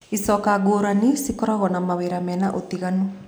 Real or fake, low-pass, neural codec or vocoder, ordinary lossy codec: real; none; none; none